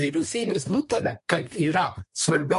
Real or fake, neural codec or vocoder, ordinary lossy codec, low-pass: fake; codec, 24 kHz, 1 kbps, SNAC; MP3, 48 kbps; 10.8 kHz